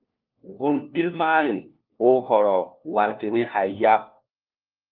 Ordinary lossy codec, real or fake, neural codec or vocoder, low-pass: Opus, 32 kbps; fake; codec, 16 kHz, 1 kbps, FunCodec, trained on LibriTTS, 50 frames a second; 5.4 kHz